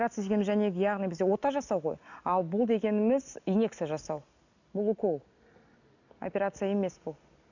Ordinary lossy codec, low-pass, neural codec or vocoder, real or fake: none; 7.2 kHz; none; real